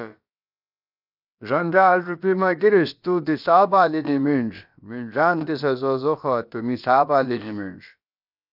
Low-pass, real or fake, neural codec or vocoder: 5.4 kHz; fake; codec, 16 kHz, about 1 kbps, DyCAST, with the encoder's durations